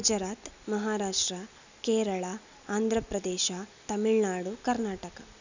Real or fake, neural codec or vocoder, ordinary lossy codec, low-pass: real; none; none; 7.2 kHz